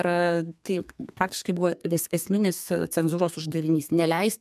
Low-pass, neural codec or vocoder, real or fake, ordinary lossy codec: 14.4 kHz; codec, 32 kHz, 1.9 kbps, SNAC; fake; MP3, 96 kbps